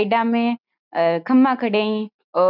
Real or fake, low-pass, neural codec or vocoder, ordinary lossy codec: real; 5.4 kHz; none; none